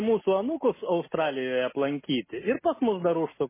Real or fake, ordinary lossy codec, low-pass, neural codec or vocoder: real; MP3, 16 kbps; 3.6 kHz; none